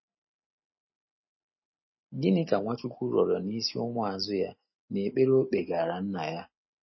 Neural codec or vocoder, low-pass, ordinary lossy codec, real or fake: none; 7.2 kHz; MP3, 24 kbps; real